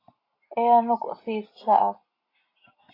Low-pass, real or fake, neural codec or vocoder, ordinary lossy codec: 5.4 kHz; real; none; AAC, 24 kbps